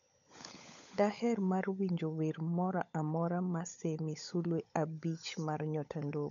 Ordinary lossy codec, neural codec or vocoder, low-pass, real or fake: none; codec, 16 kHz, 16 kbps, FunCodec, trained on LibriTTS, 50 frames a second; 7.2 kHz; fake